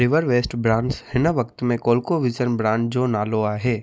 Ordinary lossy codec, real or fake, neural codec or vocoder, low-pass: none; real; none; none